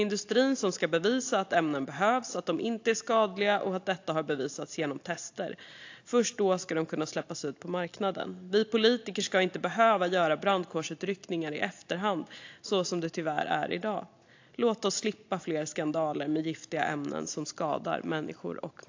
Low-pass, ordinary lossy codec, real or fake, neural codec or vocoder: 7.2 kHz; AAC, 48 kbps; real; none